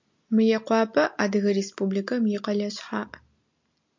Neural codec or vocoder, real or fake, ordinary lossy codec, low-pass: none; real; MP3, 48 kbps; 7.2 kHz